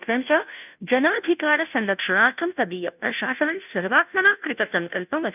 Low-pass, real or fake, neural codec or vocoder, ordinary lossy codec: 3.6 kHz; fake; codec, 16 kHz, 0.5 kbps, FunCodec, trained on Chinese and English, 25 frames a second; none